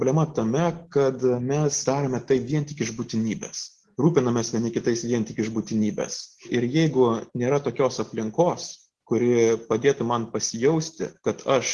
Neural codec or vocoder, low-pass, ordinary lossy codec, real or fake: none; 10.8 kHz; Opus, 16 kbps; real